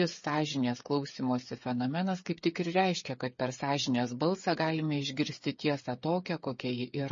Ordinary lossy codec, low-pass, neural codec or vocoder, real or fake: MP3, 32 kbps; 7.2 kHz; codec, 16 kHz, 8 kbps, FreqCodec, smaller model; fake